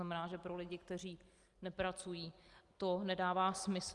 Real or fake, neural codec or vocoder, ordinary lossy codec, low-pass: real; none; Opus, 32 kbps; 10.8 kHz